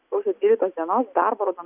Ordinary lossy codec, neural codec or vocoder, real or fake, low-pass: Opus, 64 kbps; none; real; 3.6 kHz